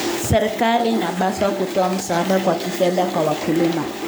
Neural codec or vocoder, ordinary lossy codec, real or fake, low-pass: codec, 44.1 kHz, 7.8 kbps, Pupu-Codec; none; fake; none